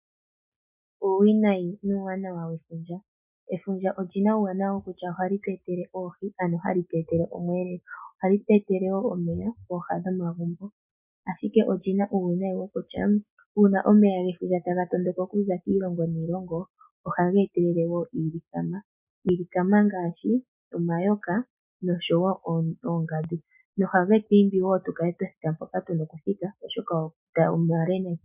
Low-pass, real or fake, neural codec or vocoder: 3.6 kHz; real; none